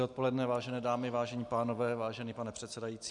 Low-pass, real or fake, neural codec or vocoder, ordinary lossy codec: 10.8 kHz; real; none; MP3, 96 kbps